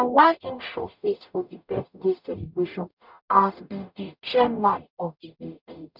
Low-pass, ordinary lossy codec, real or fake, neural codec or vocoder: 5.4 kHz; none; fake; codec, 44.1 kHz, 0.9 kbps, DAC